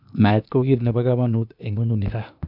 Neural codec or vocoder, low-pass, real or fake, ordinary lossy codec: codec, 16 kHz, 0.8 kbps, ZipCodec; 5.4 kHz; fake; none